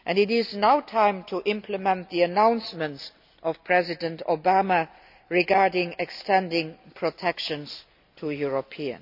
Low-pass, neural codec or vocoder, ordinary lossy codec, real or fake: 5.4 kHz; none; none; real